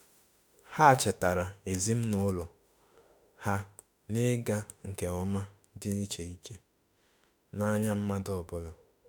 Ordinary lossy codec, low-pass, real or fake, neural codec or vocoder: none; none; fake; autoencoder, 48 kHz, 32 numbers a frame, DAC-VAE, trained on Japanese speech